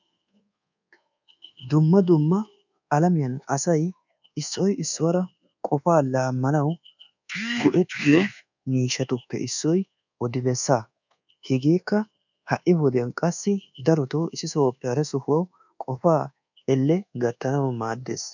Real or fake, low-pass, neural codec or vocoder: fake; 7.2 kHz; codec, 24 kHz, 1.2 kbps, DualCodec